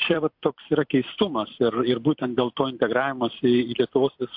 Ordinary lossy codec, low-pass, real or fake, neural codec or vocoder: MP3, 48 kbps; 5.4 kHz; fake; vocoder, 44.1 kHz, 128 mel bands every 256 samples, BigVGAN v2